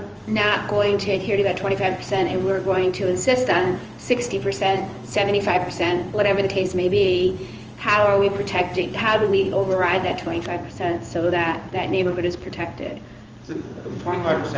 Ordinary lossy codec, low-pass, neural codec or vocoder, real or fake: Opus, 24 kbps; 7.2 kHz; codec, 16 kHz in and 24 kHz out, 1 kbps, XY-Tokenizer; fake